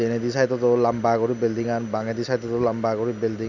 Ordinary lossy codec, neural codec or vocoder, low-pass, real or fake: none; none; 7.2 kHz; real